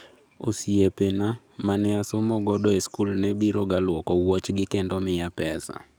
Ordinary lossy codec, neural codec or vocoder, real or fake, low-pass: none; codec, 44.1 kHz, 7.8 kbps, DAC; fake; none